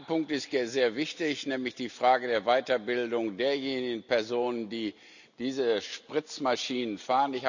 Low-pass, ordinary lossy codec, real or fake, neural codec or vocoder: 7.2 kHz; none; real; none